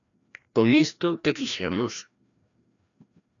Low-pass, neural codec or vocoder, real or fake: 7.2 kHz; codec, 16 kHz, 1 kbps, FreqCodec, larger model; fake